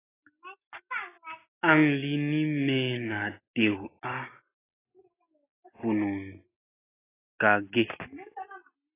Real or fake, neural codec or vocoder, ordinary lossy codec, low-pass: real; none; AAC, 16 kbps; 3.6 kHz